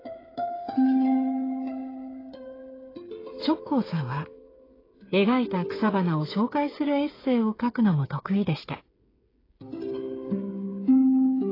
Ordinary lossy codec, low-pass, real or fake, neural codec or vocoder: AAC, 24 kbps; 5.4 kHz; fake; codec, 16 kHz, 8 kbps, FreqCodec, smaller model